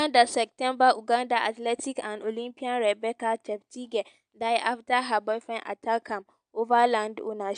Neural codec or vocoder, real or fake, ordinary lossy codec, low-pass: none; real; AAC, 96 kbps; 9.9 kHz